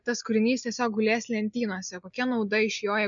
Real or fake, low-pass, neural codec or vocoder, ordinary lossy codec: real; 7.2 kHz; none; MP3, 96 kbps